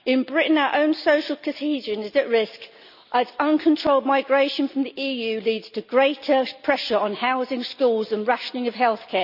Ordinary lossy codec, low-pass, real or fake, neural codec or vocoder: none; 5.4 kHz; real; none